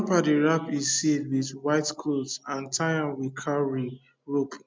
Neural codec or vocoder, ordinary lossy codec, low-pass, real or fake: none; none; none; real